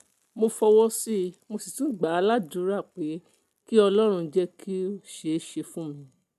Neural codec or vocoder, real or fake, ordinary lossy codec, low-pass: none; real; MP3, 96 kbps; 14.4 kHz